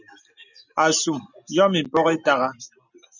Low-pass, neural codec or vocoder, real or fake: 7.2 kHz; none; real